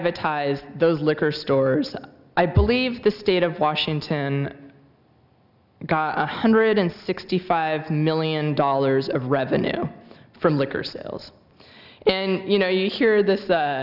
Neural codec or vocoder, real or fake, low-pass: none; real; 5.4 kHz